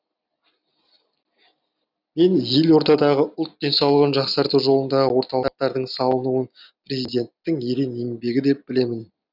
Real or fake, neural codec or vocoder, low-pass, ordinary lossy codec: real; none; 5.4 kHz; none